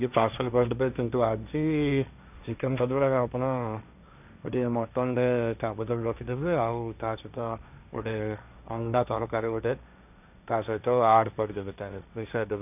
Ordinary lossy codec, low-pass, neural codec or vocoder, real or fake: none; 3.6 kHz; codec, 16 kHz, 1.1 kbps, Voila-Tokenizer; fake